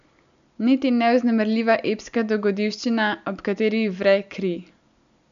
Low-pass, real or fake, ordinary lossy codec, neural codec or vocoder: 7.2 kHz; real; none; none